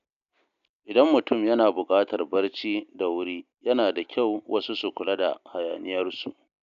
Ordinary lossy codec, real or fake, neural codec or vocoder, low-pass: none; real; none; 7.2 kHz